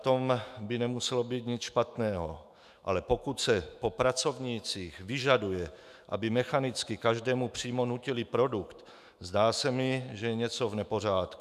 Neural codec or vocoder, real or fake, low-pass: autoencoder, 48 kHz, 128 numbers a frame, DAC-VAE, trained on Japanese speech; fake; 14.4 kHz